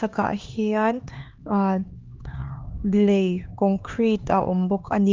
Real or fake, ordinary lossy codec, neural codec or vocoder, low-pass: fake; Opus, 32 kbps; codec, 16 kHz, 2 kbps, X-Codec, HuBERT features, trained on LibriSpeech; 7.2 kHz